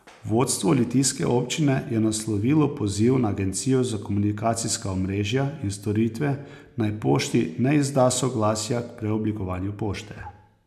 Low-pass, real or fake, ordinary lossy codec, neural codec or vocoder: 14.4 kHz; real; none; none